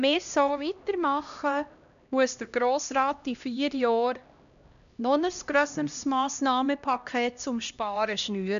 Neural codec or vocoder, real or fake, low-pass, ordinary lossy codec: codec, 16 kHz, 1 kbps, X-Codec, HuBERT features, trained on LibriSpeech; fake; 7.2 kHz; none